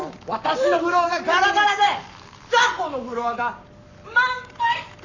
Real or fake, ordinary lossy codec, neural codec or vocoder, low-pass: fake; none; codec, 44.1 kHz, 7.8 kbps, Pupu-Codec; 7.2 kHz